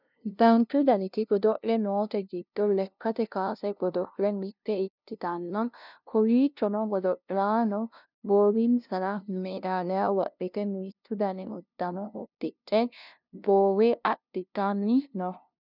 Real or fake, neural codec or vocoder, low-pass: fake; codec, 16 kHz, 0.5 kbps, FunCodec, trained on LibriTTS, 25 frames a second; 5.4 kHz